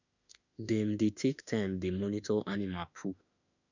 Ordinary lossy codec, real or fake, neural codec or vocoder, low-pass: none; fake; autoencoder, 48 kHz, 32 numbers a frame, DAC-VAE, trained on Japanese speech; 7.2 kHz